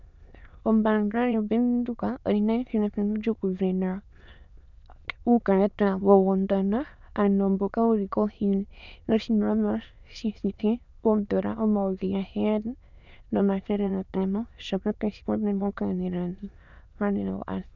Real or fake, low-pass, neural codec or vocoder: fake; 7.2 kHz; autoencoder, 22.05 kHz, a latent of 192 numbers a frame, VITS, trained on many speakers